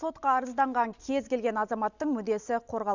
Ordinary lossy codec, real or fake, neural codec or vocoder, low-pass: none; real; none; 7.2 kHz